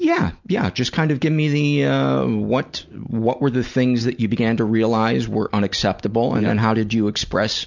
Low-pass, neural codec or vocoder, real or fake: 7.2 kHz; none; real